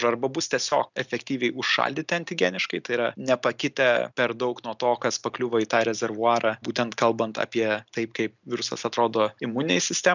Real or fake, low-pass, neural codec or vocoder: real; 7.2 kHz; none